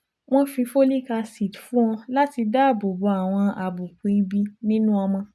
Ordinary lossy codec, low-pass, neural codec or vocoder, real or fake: none; none; none; real